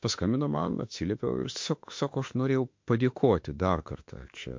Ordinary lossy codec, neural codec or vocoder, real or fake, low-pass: MP3, 48 kbps; autoencoder, 48 kHz, 32 numbers a frame, DAC-VAE, trained on Japanese speech; fake; 7.2 kHz